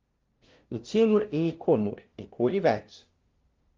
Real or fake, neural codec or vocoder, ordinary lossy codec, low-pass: fake; codec, 16 kHz, 0.5 kbps, FunCodec, trained on LibriTTS, 25 frames a second; Opus, 32 kbps; 7.2 kHz